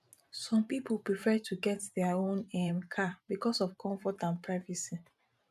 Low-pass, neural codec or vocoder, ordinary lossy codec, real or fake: 14.4 kHz; vocoder, 44.1 kHz, 128 mel bands every 512 samples, BigVGAN v2; none; fake